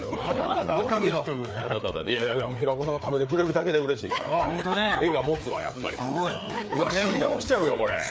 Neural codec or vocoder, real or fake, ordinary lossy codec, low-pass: codec, 16 kHz, 4 kbps, FreqCodec, larger model; fake; none; none